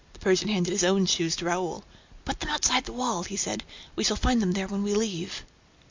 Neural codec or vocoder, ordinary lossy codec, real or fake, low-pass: none; MP3, 64 kbps; real; 7.2 kHz